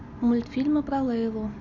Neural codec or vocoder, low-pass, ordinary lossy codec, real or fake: none; 7.2 kHz; none; real